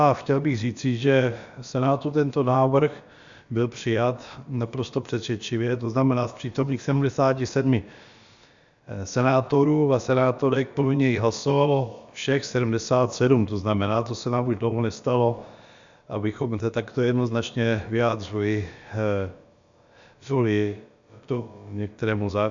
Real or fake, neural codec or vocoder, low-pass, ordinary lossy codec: fake; codec, 16 kHz, about 1 kbps, DyCAST, with the encoder's durations; 7.2 kHz; Opus, 64 kbps